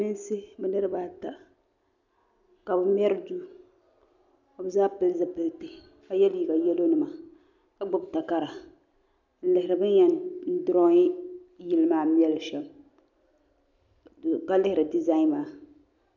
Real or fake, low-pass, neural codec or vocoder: real; 7.2 kHz; none